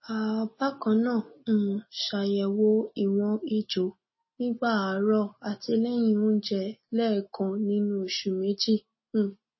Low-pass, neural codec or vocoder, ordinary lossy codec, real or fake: 7.2 kHz; none; MP3, 24 kbps; real